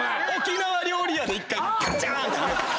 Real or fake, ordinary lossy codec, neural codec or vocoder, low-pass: real; none; none; none